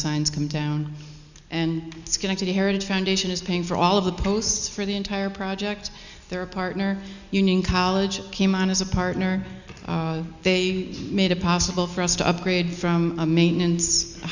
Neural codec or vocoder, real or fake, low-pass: none; real; 7.2 kHz